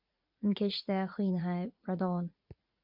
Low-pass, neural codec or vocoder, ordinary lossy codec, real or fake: 5.4 kHz; vocoder, 44.1 kHz, 80 mel bands, Vocos; MP3, 48 kbps; fake